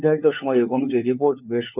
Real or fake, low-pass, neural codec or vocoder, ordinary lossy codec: fake; 3.6 kHz; codec, 16 kHz, 4 kbps, FunCodec, trained on LibriTTS, 50 frames a second; none